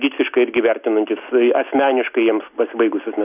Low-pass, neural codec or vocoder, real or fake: 3.6 kHz; none; real